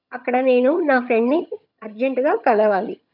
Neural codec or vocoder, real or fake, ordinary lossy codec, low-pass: vocoder, 22.05 kHz, 80 mel bands, HiFi-GAN; fake; none; 5.4 kHz